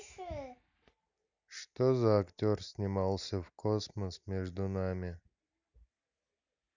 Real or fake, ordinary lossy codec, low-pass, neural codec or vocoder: real; none; 7.2 kHz; none